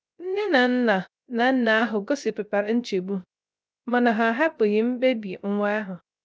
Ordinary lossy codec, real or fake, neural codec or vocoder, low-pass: none; fake; codec, 16 kHz, 0.3 kbps, FocalCodec; none